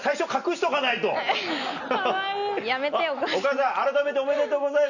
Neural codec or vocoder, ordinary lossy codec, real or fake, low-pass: none; none; real; 7.2 kHz